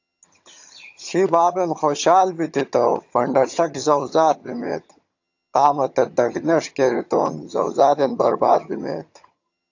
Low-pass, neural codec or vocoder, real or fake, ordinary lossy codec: 7.2 kHz; vocoder, 22.05 kHz, 80 mel bands, HiFi-GAN; fake; AAC, 48 kbps